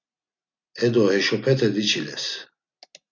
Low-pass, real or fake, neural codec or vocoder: 7.2 kHz; real; none